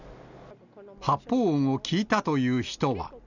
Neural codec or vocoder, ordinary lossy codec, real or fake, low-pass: none; none; real; 7.2 kHz